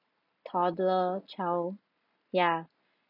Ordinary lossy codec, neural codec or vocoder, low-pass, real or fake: none; none; 5.4 kHz; real